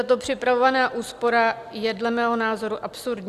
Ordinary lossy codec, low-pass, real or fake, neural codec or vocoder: Opus, 64 kbps; 14.4 kHz; real; none